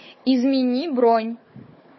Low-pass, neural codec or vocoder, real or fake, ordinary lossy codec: 7.2 kHz; codec, 16 kHz, 4 kbps, FunCodec, trained on Chinese and English, 50 frames a second; fake; MP3, 24 kbps